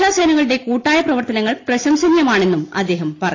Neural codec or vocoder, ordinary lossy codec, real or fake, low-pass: none; AAC, 32 kbps; real; 7.2 kHz